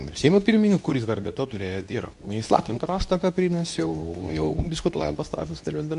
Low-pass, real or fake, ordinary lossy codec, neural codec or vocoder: 10.8 kHz; fake; MP3, 64 kbps; codec, 24 kHz, 0.9 kbps, WavTokenizer, medium speech release version 2